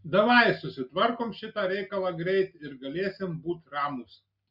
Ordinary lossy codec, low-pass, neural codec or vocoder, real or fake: Opus, 64 kbps; 5.4 kHz; none; real